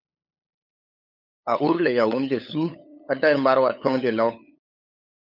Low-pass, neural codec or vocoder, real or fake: 5.4 kHz; codec, 16 kHz, 8 kbps, FunCodec, trained on LibriTTS, 25 frames a second; fake